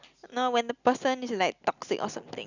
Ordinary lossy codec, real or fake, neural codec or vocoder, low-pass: none; real; none; 7.2 kHz